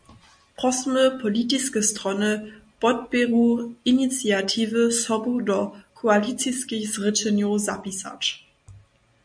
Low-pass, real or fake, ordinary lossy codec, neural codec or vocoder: 9.9 kHz; real; MP3, 96 kbps; none